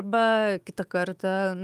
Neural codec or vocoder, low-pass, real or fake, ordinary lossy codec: autoencoder, 48 kHz, 128 numbers a frame, DAC-VAE, trained on Japanese speech; 14.4 kHz; fake; Opus, 32 kbps